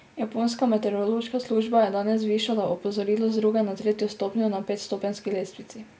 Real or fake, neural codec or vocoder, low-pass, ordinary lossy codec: real; none; none; none